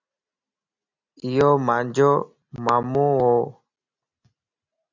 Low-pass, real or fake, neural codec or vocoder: 7.2 kHz; real; none